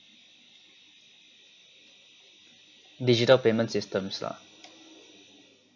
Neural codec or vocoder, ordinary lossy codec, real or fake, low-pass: none; MP3, 64 kbps; real; 7.2 kHz